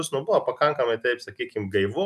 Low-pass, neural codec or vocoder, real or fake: 14.4 kHz; none; real